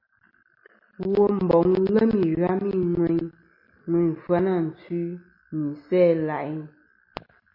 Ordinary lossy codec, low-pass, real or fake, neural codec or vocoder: MP3, 32 kbps; 5.4 kHz; real; none